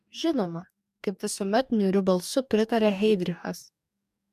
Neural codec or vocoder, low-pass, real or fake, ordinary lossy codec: codec, 44.1 kHz, 2.6 kbps, DAC; 14.4 kHz; fake; MP3, 96 kbps